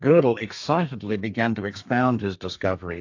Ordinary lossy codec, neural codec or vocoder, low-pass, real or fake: AAC, 48 kbps; codec, 32 kHz, 1.9 kbps, SNAC; 7.2 kHz; fake